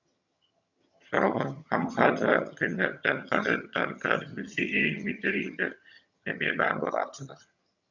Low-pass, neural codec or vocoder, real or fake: 7.2 kHz; vocoder, 22.05 kHz, 80 mel bands, HiFi-GAN; fake